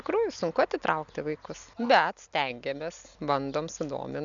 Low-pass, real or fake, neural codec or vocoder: 7.2 kHz; real; none